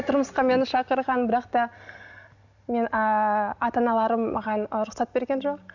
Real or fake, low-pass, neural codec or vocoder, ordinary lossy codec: real; 7.2 kHz; none; none